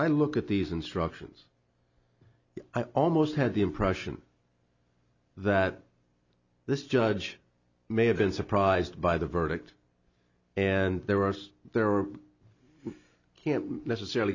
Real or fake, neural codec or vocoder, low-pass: real; none; 7.2 kHz